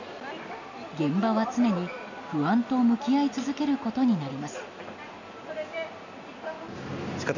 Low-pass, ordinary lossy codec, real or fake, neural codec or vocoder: 7.2 kHz; none; real; none